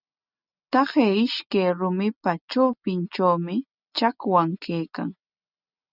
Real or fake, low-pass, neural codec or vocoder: real; 5.4 kHz; none